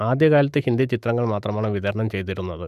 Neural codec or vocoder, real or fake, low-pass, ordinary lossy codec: autoencoder, 48 kHz, 128 numbers a frame, DAC-VAE, trained on Japanese speech; fake; 14.4 kHz; AAC, 96 kbps